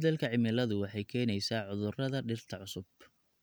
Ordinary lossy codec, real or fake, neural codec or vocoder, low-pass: none; real; none; none